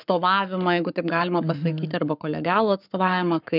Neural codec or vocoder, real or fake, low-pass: codec, 44.1 kHz, 7.8 kbps, Pupu-Codec; fake; 5.4 kHz